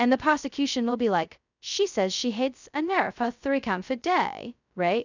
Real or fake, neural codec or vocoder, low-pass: fake; codec, 16 kHz, 0.2 kbps, FocalCodec; 7.2 kHz